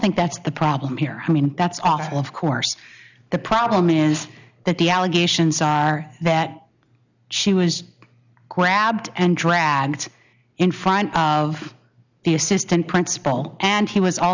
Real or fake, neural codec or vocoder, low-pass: real; none; 7.2 kHz